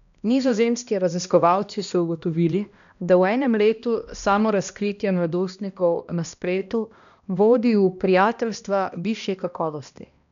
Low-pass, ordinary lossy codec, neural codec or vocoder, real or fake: 7.2 kHz; none; codec, 16 kHz, 1 kbps, X-Codec, HuBERT features, trained on balanced general audio; fake